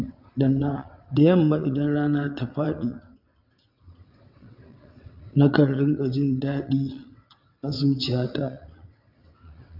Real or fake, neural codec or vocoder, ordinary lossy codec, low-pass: fake; vocoder, 22.05 kHz, 80 mel bands, WaveNeXt; MP3, 48 kbps; 5.4 kHz